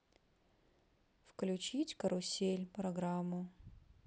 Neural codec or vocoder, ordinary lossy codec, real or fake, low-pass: none; none; real; none